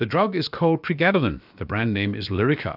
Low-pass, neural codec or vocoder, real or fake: 5.4 kHz; codec, 16 kHz, about 1 kbps, DyCAST, with the encoder's durations; fake